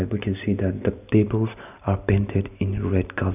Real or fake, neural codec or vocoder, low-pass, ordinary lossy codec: real; none; 3.6 kHz; none